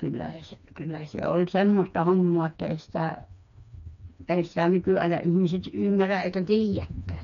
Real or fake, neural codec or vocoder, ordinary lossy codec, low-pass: fake; codec, 16 kHz, 2 kbps, FreqCodec, smaller model; none; 7.2 kHz